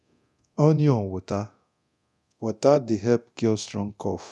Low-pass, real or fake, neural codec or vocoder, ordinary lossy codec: none; fake; codec, 24 kHz, 0.9 kbps, DualCodec; none